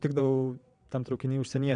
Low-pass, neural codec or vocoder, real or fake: 9.9 kHz; vocoder, 22.05 kHz, 80 mel bands, WaveNeXt; fake